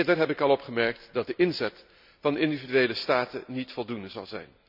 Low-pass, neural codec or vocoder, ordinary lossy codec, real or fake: 5.4 kHz; none; none; real